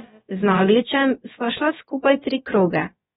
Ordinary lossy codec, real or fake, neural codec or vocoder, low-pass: AAC, 16 kbps; fake; codec, 16 kHz, about 1 kbps, DyCAST, with the encoder's durations; 7.2 kHz